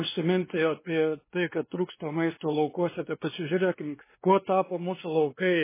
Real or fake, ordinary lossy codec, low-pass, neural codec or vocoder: fake; MP3, 16 kbps; 3.6 kHz; codec, 16 kHz, 2 kbps, X-Codec, WavLM features, trained on Multilingual LibriSpeech